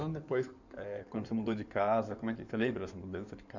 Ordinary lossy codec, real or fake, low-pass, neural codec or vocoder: none; fake; 7.2 kHz; codec, 16 kHz in and 24 kHz out, 2.2 kbps, FireRedTTS-2 codec